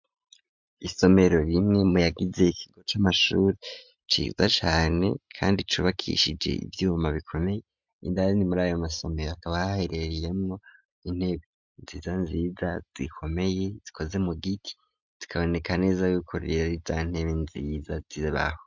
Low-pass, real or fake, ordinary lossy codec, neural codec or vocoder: 7.2 kHz; real; MP3, 64 kbps; none